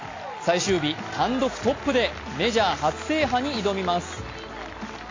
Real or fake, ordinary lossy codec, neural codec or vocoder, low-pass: real; AAC, 32 kbps; none; 7.2 kHz